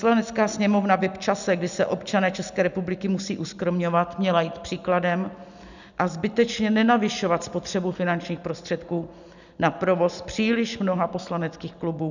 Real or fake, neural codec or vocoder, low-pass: real; none; 7.2 kHz